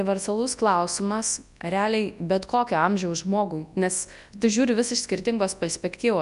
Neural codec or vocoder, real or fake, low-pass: codec, 24 kHz, 0.9 kbps, WavTokenizer, large speech release; fake; 10.8 kHz